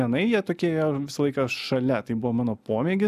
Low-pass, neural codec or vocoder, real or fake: 14.4 kHz; none; real